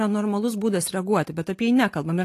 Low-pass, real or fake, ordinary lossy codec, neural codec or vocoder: 14.4 kHz; real; AAC, 48 kbps; none